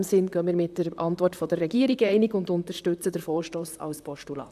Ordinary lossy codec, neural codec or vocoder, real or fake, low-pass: none; vocoder, 44.1 kHz, 128 mel bands, Pupu-Vocoder; fake; 14.4 kHz